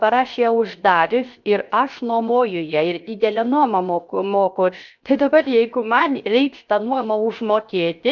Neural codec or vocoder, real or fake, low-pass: codec, 16 kHz, 0.7 kbps, FocalCodec; fake; 7.2 kHz